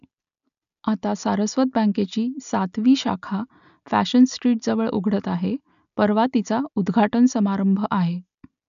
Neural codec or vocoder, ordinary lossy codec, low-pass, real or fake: none; none; 7.2 kHz; real